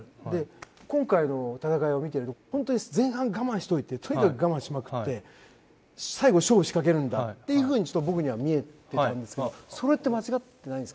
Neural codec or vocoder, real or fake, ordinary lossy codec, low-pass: none; real; none; none